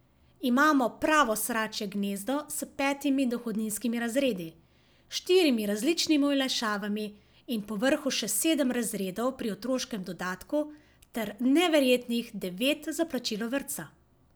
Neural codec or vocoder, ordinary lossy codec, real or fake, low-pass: none; none; real; none